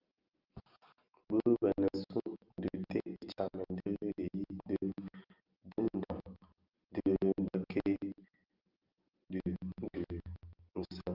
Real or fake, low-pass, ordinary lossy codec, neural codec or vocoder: real; 5.4 kHz; Opus, 24 kbps; none